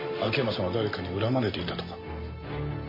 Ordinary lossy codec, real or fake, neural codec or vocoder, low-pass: MP3, 24 kbps; real; none; 5.4 kHz